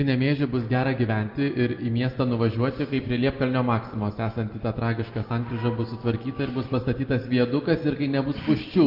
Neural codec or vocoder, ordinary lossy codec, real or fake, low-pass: none; Opus, 24 kbps; real; 5.4 kHz